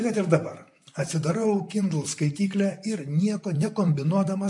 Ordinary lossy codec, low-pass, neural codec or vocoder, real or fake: MP3, 64 kbps; 10.8 kHz; vocoder, 44.1 kHz, 128 mel bands, Pupu-Vocoder; fake